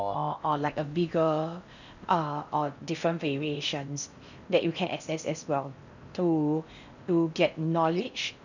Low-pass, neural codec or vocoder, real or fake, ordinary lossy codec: 7.2 kHz; codec, 16 kHz in and 24 kHz out, 0.6 kbps, FocalCodec, streaming, 4096 codes; fake; none